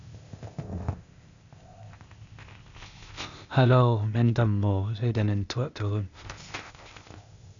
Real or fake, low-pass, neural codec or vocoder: fake; 7.2 kHz; codec, 16 kHz, 0.8 kbps, ZipCodec